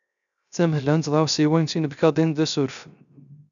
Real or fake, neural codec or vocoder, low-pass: fake; codec, 16 kHz, 0.3 kbps, FocalCodec; 7.2 kHz